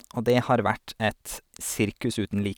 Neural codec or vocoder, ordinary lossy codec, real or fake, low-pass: none; none; real; none